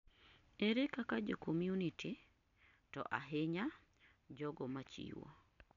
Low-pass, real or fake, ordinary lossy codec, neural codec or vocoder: 7.2 kHz; real; none; none